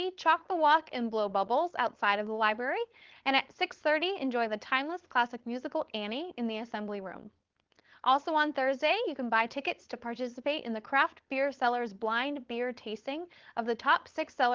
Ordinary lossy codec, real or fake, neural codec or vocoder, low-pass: Opus, 16 kbps; fake; codec, 16 kHz, 4.8 kbps, FACodec; 7.2 kHz